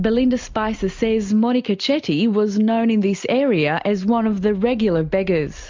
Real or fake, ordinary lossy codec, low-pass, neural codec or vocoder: real; MP3, 64 kbps; 7.2 kHz; none